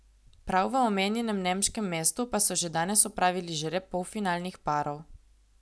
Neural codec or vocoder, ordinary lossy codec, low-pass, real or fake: none; none; none; real